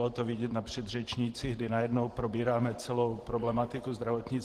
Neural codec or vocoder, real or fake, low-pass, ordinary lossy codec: vocoder, 44.1 kHz, 128 mel bands every 512 samples, BigVGAN v2; fake; 14.4 kHz; Opus, 16 kbps